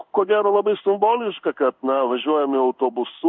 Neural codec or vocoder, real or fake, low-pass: codec, 16 kHz in and 24 kHz out, 1 kbps, XY-Tokenizer; fake; 7.2 kHz